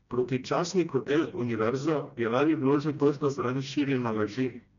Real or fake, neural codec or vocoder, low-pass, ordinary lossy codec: fake; codec, 16 kHz, 1 kbps, FreqCodec, smaller model; 7.2 kHz; none